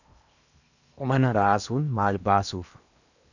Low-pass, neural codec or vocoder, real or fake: 7.2 kHz; codec, 16 kHz in and 24 kHz out, 0.8 kbps, FocalCodec, streaming, 65536 codes; fake